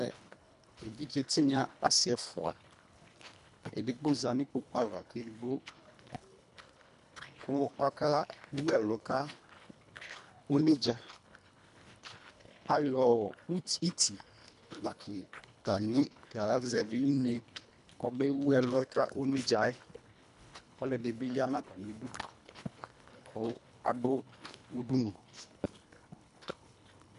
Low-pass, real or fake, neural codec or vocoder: 10.8 kHz; fake; codec, 24 kHz, 1.5 kbps, HILCodec